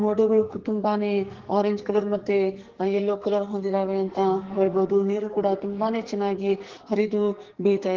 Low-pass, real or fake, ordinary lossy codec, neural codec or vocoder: 7.2 kHz; fake; Opus, 16 kbps; codec, 44.1 kHz, 2.6 kbps, SNAC